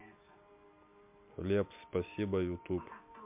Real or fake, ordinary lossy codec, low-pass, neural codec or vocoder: real; MP3, 32 kbps; 3.6 kHz; none